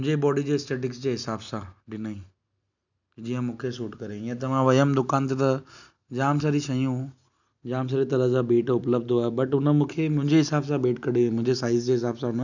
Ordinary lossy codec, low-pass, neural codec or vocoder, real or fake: none; 7.2 kHz; none; real